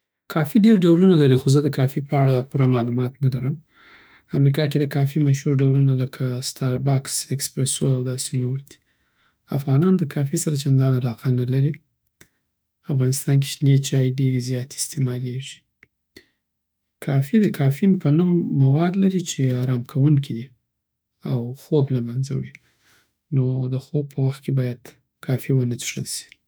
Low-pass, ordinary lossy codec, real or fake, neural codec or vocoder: none; none; fake; autoencoder, 48 kHz, 32 numbers a frame, DAC-VAE, trained on Japanese speech